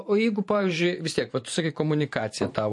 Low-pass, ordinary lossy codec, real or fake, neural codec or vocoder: 10.8 kHz; MP3, 48 kbps; fake; vocoder, 44.1 kHz, 128 mel bands every 512 samples, BigVGAN v2